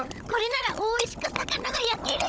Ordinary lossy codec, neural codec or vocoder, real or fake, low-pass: none; codec, 16 kHz, 16 kbps, FunCodec, trained on Chinese and English, 50 frames a second; fake; none